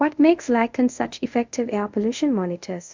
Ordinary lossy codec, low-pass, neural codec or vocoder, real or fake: MP3, 64 kbps; 7.2 kHz; codec, 24 kHz, 0.5 kbps, DualCodec; fake